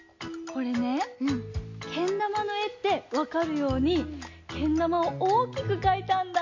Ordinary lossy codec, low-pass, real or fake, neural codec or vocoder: MP3, 48 kbps; 7.2 kHz; real; none